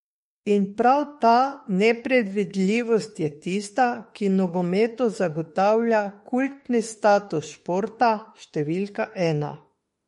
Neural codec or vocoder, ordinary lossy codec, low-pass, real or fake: autoencoder, 48 kHz, 32 numbers a frame, DAC-VAE, trained on Japanese speech; MP3, 48 kbps; 19.8 kHz; fake